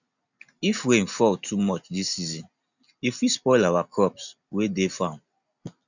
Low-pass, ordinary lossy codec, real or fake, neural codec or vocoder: 7.2 kHz; none; real; none